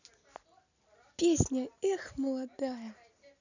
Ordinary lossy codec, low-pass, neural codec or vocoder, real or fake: none; 7.2 kHz; none; real